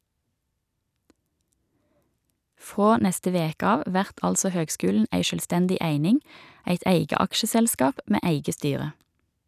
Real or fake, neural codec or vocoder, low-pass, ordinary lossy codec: real; none; 14.4 kHz; none